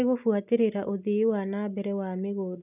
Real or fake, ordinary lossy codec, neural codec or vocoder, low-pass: real; none; none; 3.6 kHz